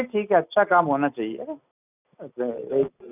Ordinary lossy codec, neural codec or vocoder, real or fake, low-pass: none; none; real; 3.6 kHz